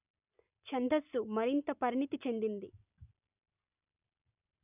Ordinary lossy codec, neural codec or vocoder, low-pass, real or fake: none; none; 3.6 kHz; real